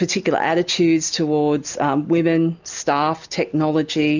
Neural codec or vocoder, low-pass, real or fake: none; 7.2 kHz; real